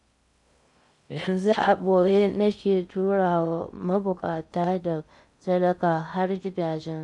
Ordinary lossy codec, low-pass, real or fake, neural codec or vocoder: none; 10.8 kHz; fake; codec, 16 kHz in and 24 kHz out, 0.6 kbps, FocalCodec, streaming, 2048 codes